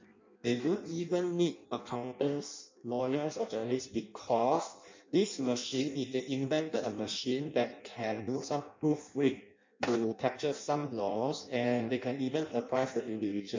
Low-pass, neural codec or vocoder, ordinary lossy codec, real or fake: 7.2 kHz; codec, 16 kHz in and 24 kHz out, 0.6 kbps, FireRedTTS-2 codec; MP3, 64 kbps; fake